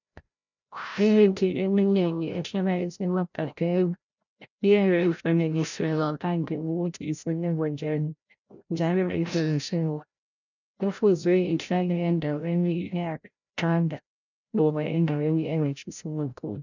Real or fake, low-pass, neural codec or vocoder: fake; 7.2 kHz; codec, 16 kHz, 0.5 kbps, FreqCodec, larger model